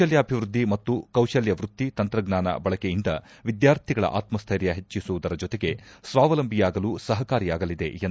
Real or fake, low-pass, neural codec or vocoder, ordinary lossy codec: real; none; none; none